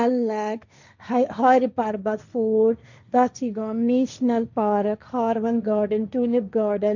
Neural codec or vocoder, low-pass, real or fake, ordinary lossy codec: codec, 16 kHz, 1.1 kbps, Voila-Tokenizer; 7.2 kHz; fake; none